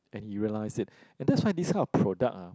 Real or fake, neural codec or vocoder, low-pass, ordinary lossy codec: real; none; none; none